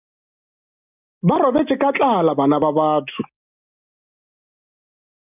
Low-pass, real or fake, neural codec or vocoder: 3.6 kHz; real; none